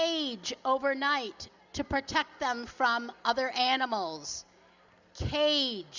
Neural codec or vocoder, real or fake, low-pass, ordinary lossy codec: none; real; 7.2 kHz; Opus, 64 kbps